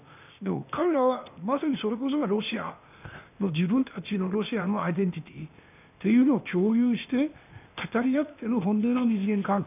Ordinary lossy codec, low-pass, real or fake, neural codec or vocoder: none; 3.6 kHz; fake; codec, 16 kHz, 0.8 kbps, ZipCodec